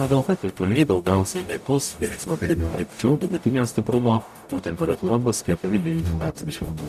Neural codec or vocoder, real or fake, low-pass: codec, 44.1 kHz, 0.9 kbps, DAC; fake; 14.4 kHz